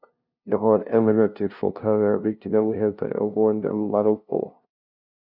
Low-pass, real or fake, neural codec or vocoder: 5.4 kHz; fake; codec, 16 kHz, 0.5 kbps, FunCodec, trained on LibriTTS, 25 frames a second